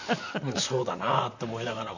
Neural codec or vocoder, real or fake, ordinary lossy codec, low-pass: vocoder, 44.1 kHz, 128 mel bands, Pupu-Vocoder; fake; none; 7.2 kHz